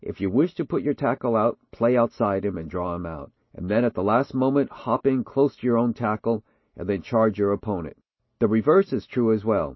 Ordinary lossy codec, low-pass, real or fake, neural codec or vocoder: MP3, 24 kbps; 7.2 kHz; real; none